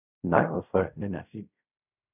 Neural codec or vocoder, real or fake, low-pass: codec, 16 kHz in and 24 kHz out, 0.4 kbps, LongCat-Audio-Codec, fine tuned four codebook decoder; fake; 3.6 kHz